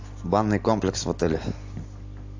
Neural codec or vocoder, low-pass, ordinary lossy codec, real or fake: none; 7.2 kHz; AAC, 48 kbps; real